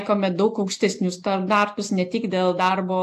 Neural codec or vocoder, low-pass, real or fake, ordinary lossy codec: none; 14.4 kHz; real; AAC, 64 kbps